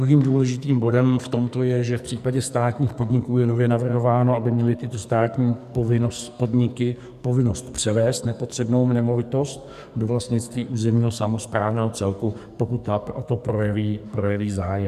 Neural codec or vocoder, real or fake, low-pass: codec, 44.1 kHz, 2.6 kbps, SNAC; fake; 14.4 kHz